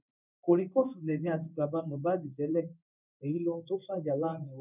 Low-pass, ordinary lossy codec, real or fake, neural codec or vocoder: 3.6 kHz; none; fake; codec, 16 kHz in and 24 kHz out, 1 kbps, XY-Tokenizer